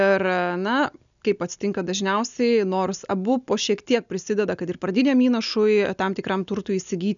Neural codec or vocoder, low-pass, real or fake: none; 7.2 kHz; real